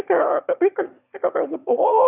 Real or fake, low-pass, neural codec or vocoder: fake; 3.6 kHz; autoencoder, 22.05 kHz, a latent of 192 numbers a frame, VITS, trained on one speaker